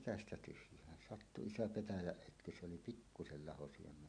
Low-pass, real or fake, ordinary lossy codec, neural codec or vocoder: 9.9 kHz; real; none; none